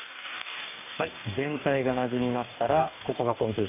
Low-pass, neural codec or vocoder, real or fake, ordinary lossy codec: 3.6 kHz; codec, 44.1 kHz, 2.6 kbps, DAC; fake; none